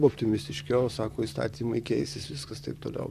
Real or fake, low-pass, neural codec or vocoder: fake; 14.4 kHz; vocoder, 44.1 kHz, 128 mel bands, Pupu-Vocoder